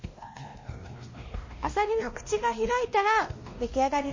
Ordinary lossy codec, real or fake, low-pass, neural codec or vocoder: MP3, 32 kbps; fake; 7.2 kHz; codec, 16 kHz, 1 kbps, X-Codec, WavLM features, trained on Multilingual LibriSpeech